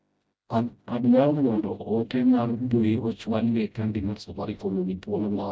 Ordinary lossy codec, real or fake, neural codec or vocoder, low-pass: none; fake; codec, 16 kHz, 0.5 kbps, FreqCodec, smaller model; none